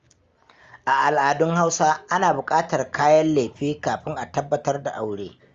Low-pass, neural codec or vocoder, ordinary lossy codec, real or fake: 7.2 kHz; none; Opus, 32 kbps; real